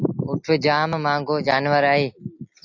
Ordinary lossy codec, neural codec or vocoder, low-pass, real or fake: AAC, 48 kbps; none; 7.2 kHz; real